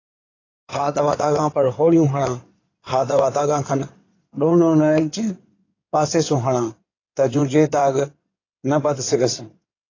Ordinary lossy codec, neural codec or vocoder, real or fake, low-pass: AAC, 32 kbps; codec, 16 kHz in and 24 kHz out, 2.2 kbps, FireRedTTS-2 codec; fake; 7.2 kHz